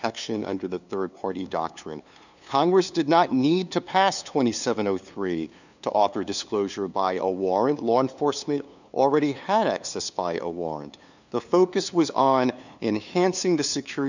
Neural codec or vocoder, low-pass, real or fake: codec, 16 kHz, 2 kbps, FunCodec, trained on LibriTTS, 25 frames a second; 7.2 kHz; fake